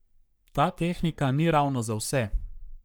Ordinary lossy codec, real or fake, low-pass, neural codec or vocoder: none; fake; none; codec, 44.1 kHz, 3.4 kbps, Pupu-Codec